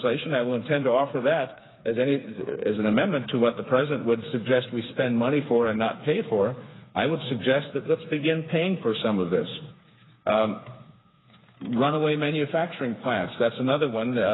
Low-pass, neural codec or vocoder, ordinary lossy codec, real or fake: 7.2 kHz; codec, 16 kHz, 4 kbps, FreqCodec, smaller model; AAC, 16 kbps; fake